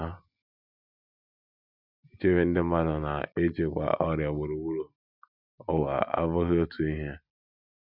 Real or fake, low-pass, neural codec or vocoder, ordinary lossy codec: real; 5.4 kHz; none; none